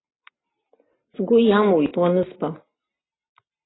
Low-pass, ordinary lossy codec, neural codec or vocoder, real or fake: 7.2 kHz; AAC, 16 kbps; none; real